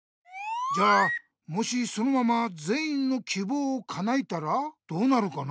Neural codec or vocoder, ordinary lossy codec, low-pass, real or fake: none; none; none; real